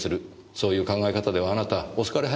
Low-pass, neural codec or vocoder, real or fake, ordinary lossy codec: none; none; real; none